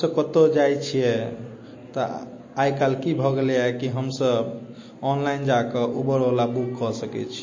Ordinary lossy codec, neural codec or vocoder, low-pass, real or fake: MP3, 32 kbps; none; 7.2 kHz; real